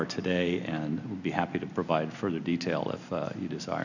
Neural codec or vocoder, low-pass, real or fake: none; 7.2 kHz; real